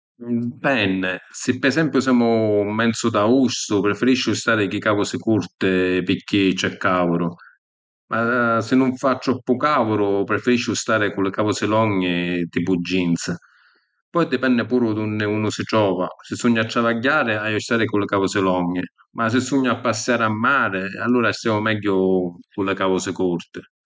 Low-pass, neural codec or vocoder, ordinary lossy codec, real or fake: none; none; none; real